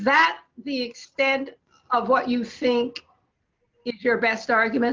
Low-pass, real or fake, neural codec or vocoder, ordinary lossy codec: 7.2 kHz; real; none; Opus, 24 kbps